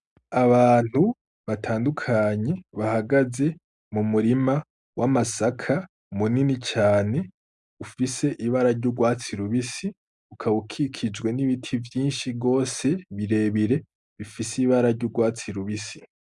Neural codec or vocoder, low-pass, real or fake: none; 10.8 kHz; real